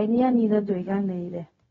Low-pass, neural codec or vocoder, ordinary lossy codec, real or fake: 7.2 kHz; codec, 16 kHz, 0.4 kbps, LongCat-Audio-Codec; AAC, 24 kbps; fake